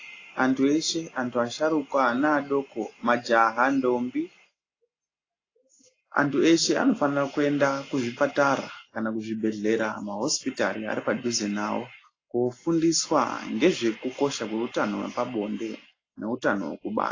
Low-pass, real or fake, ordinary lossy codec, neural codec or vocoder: 7.2 kHz; real; AAC, 32 kbps; none